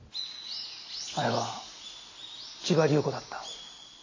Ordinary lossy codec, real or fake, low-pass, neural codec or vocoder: AAC, 32 kbps; real; 7.2 kHz; none